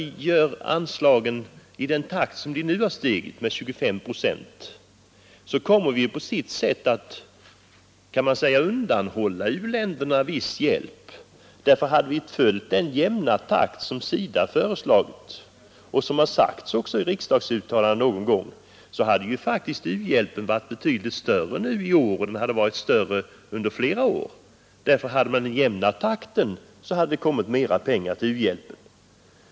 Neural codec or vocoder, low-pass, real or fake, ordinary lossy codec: none; none; real; none